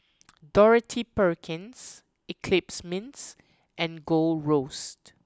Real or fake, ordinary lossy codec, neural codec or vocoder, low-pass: real; none; none; none